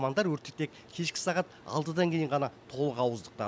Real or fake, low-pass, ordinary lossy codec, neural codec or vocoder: real; none; none; none